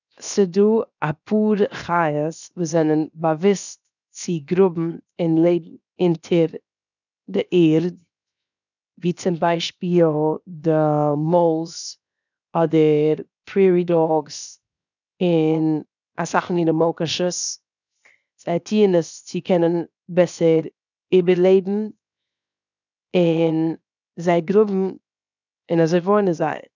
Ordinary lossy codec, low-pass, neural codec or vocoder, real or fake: none; 7.2 kHz; codec, 16 kHz, 0.7 kbps, FocalCodec; fake